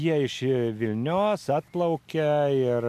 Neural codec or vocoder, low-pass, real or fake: none; 14.4 kHz; real